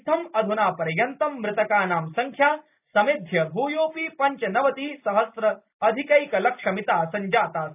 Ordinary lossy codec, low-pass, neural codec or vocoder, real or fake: none; 3.6 kHz; none; real